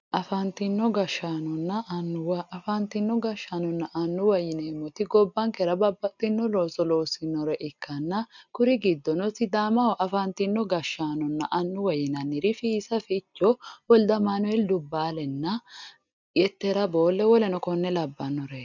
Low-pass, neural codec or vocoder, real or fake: 7.2 kHz; none; real